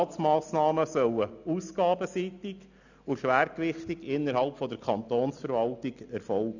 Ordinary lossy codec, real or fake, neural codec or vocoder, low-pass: none; real; none; 7.2 kHz